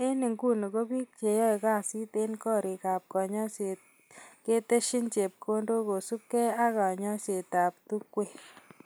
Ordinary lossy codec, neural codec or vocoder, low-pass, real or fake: none; none; none; real